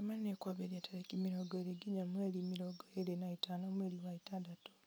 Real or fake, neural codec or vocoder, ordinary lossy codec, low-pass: real; none; none; none